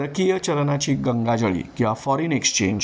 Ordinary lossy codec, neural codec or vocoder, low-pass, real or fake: none; none; none; real